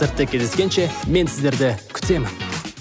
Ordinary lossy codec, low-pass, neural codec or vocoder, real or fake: none; none; none; real